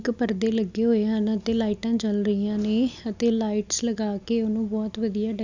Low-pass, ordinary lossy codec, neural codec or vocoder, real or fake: 7.2 kHz; none; none; real